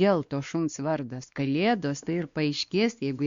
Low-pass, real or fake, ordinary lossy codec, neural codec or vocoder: 7.2 kHz; fake; Opus, 64 kbps; codec, 16 kHz, 2 kbps, X-Codec, WavLM features, trained on Multilingual LibriSpeech